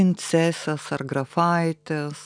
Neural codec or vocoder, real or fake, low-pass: none; real; 9.9 kHz